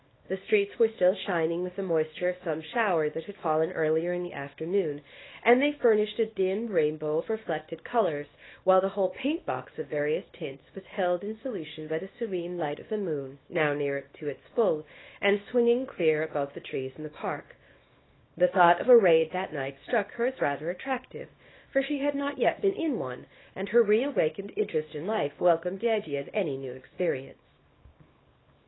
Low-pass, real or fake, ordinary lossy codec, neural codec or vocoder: 7.2 kHz; fake; AAC, 16 kbps; codec, 24 kHz, 0.9 kbps, WavTokenizer, small release